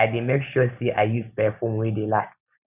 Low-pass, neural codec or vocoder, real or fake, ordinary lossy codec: 3.6 kHz; none; real; none